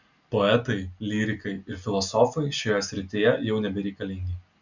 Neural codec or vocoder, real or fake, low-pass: none; real; 7.2 kHz